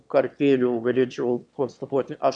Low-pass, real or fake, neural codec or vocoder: 9.9 kHz; fake; autoencoder, 22.05 kHz, a latent of 192 numbers a frame, VITS, trained on one speaker